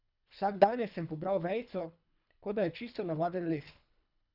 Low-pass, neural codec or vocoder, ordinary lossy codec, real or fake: 5.4 kHz; codec, 24 kHz, 3 kbps, HILCodec; none; fake